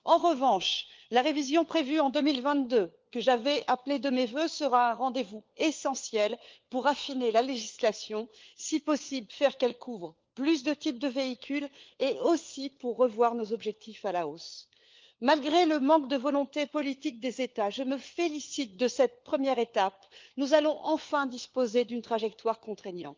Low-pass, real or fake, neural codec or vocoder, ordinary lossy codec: 7.2 kHz; fake; codec, 16 kHz, 4 kbps, FunCodec, trained on LibriTTS, 50 frames a second; Opus, 24 kbps